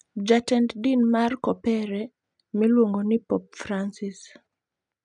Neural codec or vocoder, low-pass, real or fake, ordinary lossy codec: none; 10.8 kHz; real; none